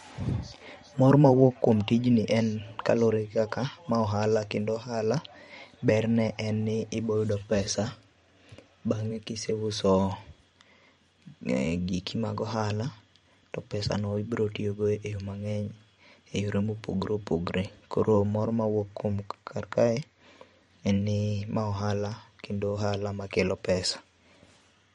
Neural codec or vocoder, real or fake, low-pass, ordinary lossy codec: vocoder, 44.1 kHz, 128 mel bands every 512 samples, BigVGAN v2; fake; 19.8 kHz; MP3, 48 kbps